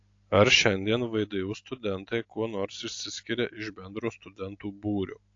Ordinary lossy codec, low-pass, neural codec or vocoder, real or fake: AAC, 48 kbps; 7.2 kHz; none; real